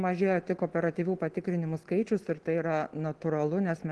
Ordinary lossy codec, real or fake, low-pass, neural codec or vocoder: Opus, 16 kbps; real; 10.8 kHz; none